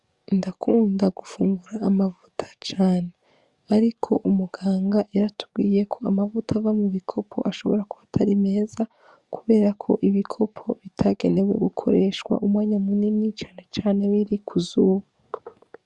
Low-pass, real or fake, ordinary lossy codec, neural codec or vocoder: 10.8 kHz; fake; Opus, 64 kbps; codec, 44.1 kHz, 7.8 kbps, DAC